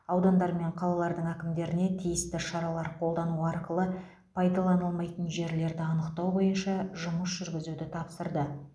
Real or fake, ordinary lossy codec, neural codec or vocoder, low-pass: real; none; none; none